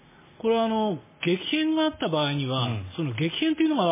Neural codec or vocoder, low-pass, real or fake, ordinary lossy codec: none; 3.6 kHz; real; MP3, 16 kbps